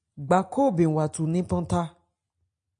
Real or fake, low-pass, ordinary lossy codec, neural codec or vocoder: real; 9.9 kHz; AAC, 64 kbps; none